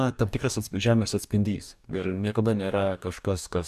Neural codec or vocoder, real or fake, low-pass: codec, 44.1 kHz, 2.6 kbps, DAC; fake; 14.4 kHz